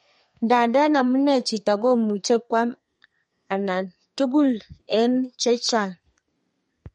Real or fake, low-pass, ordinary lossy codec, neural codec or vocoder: fake; 14.4 kHz; MP3, 48 kbps; codec, 32 kHz, 1.9 kbps, SNAC